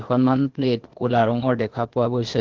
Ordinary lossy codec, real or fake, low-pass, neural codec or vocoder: Opus, 16 kbps; fake; 7.2 kHz; codec, 16 kHz, 0.8 kbps, ZipCodec